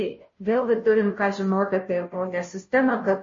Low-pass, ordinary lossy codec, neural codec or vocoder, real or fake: 7.2 kHz; MP3, 32 kbps; codec, 16 kHz, 0.5 kbps, FunCodec, trained on Chinese and English, 25 frames a second; fake